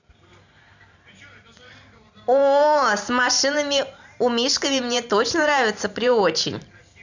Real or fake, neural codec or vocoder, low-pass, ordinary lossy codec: fake; vocoder, 44.1 kHz, 128 mel bands every 512 samples, BigVGAN v2; 7.2 kHz; none